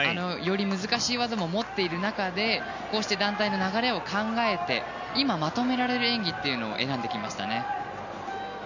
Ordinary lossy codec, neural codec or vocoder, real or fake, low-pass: none; none; real; 7.2 kHz